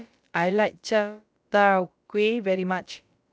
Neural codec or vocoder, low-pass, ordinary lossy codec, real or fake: codec, 16 kHz, about 1 kbps, DyCAST, with the encoder's durations; none; none; fake